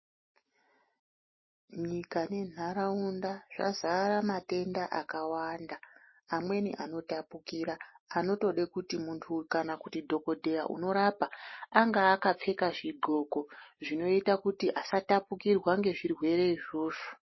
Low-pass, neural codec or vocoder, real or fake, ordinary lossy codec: 7.2 kHz; none; real; MP3, 24 kbps